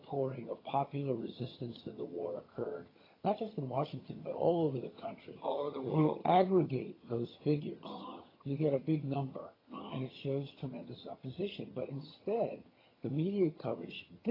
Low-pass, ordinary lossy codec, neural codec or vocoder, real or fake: 5.4 kHz; AAC, 24 kbps; vocoder, 22.05 kHz, 80 mel bands, HiFi-GAN; fake